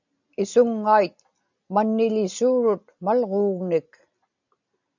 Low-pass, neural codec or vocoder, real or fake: 7.2 kHz; none; real